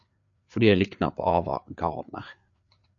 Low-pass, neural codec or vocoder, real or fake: 7.2 kHz; codec, 16 kHz, 4 kbps, FreqCodec, larger model; fake